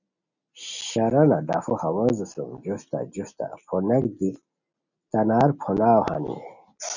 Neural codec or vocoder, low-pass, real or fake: none; 7.2 kHz; real